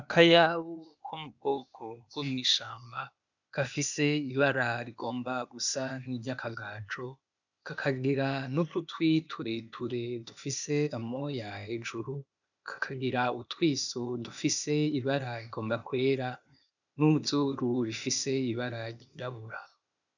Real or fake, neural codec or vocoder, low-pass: fake; codec, 16 kHz, 0.8 kbps, ZipCodec; 7.2 kHz